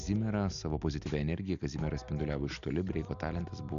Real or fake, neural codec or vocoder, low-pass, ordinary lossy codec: real; none; 7.2 kHz; MP3, 96 kbps